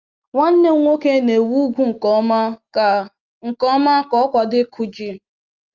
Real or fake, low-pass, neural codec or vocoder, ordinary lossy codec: real; 7.2 kHz; none; Opus, 32 kbps